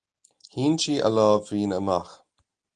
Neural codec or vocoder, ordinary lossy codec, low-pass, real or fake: none; Opus, 24 kbps; 9.9 kHz; real